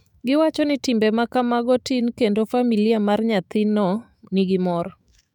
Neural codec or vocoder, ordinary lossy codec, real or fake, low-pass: autoencoder, 48 kHz, 128 numbers a frame, DAC-VAE, trained on Japanese speech; none; fake; 19.8 kHz